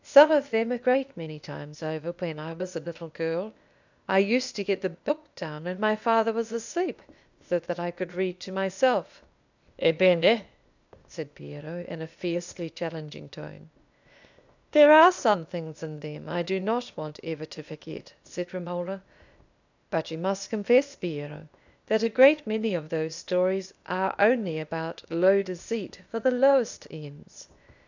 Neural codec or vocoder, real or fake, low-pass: codec, 16 kHz, 0.8 kbps, ZipCodec; fake; 7.2 kHz